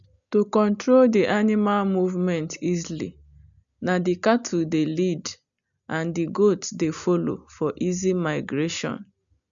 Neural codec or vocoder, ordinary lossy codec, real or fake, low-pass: none; none; real; 7.2 kHz